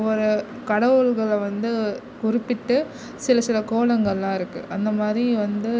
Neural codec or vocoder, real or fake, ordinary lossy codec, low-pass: none; real; none; none